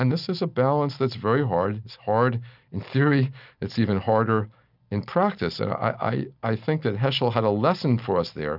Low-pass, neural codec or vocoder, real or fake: 5.4 kHz; none; real